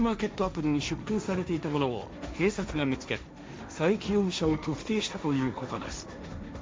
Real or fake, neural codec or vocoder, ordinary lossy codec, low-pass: fake; codec, 16 kHz, 1.1 kbps, Voila-Tokenizer; none; none